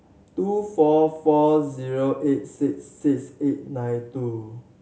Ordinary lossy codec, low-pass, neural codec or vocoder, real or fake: none; none; none; real